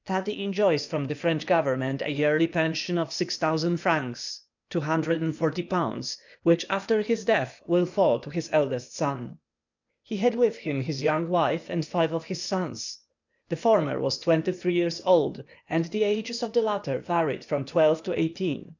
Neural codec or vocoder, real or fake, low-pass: codec, 16 kHz, 0.8 kbps, ZipCodec; fake; 7.2 kHz